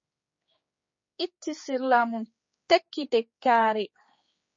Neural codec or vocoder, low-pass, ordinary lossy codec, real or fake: codec, 16 kHz, 4 kbps, X-Codec, HuBERT features, trained on general audio; 7.2 kHz; MP3, 32 kbps; fake